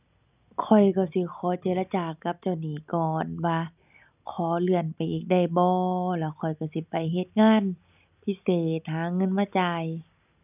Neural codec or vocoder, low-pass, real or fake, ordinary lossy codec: none; 3.6 kHz; real; none